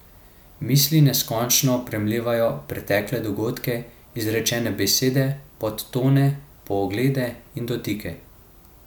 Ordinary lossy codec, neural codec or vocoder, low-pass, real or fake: none; none; none; real